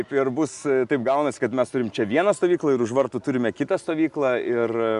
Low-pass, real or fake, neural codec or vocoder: 10.8 kHz; real; none